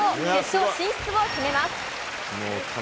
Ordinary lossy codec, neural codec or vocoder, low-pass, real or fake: none; none; none; real